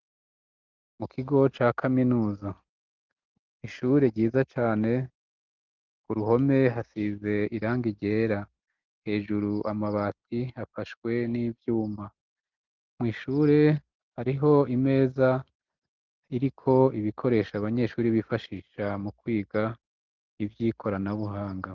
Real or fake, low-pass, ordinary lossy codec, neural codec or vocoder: real; 7.2 kHz; Opus, 32 kbps; none